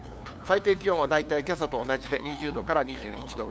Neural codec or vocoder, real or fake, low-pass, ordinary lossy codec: codec, 16 kHz, 2 kbps, FunCodec, trained on LibriTTS, 25 frames a second; fake; none; none